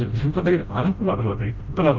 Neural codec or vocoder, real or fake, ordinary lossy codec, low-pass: codec, 16 kHz, 0.5 kbps, FreqCodec, smaller model; fake; Opus, 24 kbps; 7.2 kHz